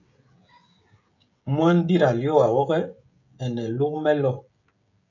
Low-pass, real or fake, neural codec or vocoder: 7.2 kHz; fake; codec, 16 kHz, 16 kbps, FreqCodec, smaller model